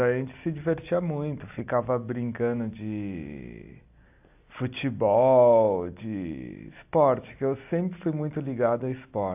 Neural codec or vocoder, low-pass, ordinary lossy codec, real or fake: none; 3.6 kHz; AAC, 32 kbps; real